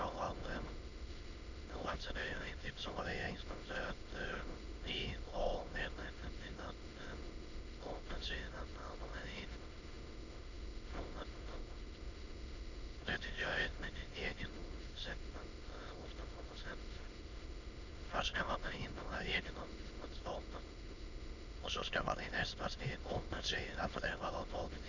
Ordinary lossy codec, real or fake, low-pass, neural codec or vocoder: none; fake; 7.2 kHz; autoencoder, 22.05 kHz, a latent of 192 numbers a frame, VITS, trained on many speakers